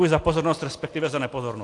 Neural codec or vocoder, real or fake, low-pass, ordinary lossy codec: none; real; 10.8 kHz; AAC, 48 kbps